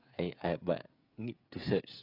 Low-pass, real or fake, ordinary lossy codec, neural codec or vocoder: 5.4 kHz; fake; AAC, 24 kbps; codec, 16 kHz, 16 kbps, FreqCodec, smaller model